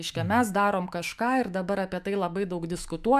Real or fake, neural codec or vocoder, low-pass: fake; autoencoder, 48 kHz, 128 numbers a frame, DAC-VAE, trained on Japanese speech; 14.4 kHz